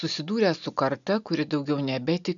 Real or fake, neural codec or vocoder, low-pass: real; none; 7.2 kHz